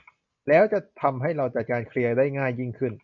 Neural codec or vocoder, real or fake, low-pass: none; real; 7.2 kHz